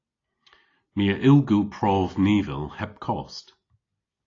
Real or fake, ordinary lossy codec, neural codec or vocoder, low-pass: real; AAC, 32 kbps; none; 7.2 kHz